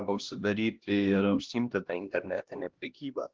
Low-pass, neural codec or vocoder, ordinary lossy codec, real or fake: 7.2 kHz; codec, 16 kHz, 1 kbps, X-Codec, HuBERT features, trained on LibriSpeech; Opus, 16 kbps; fake